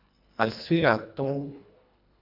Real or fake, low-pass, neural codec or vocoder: fake; 5.4 kHz; codec, 24 kHz, 1.5 kbps, HILCodec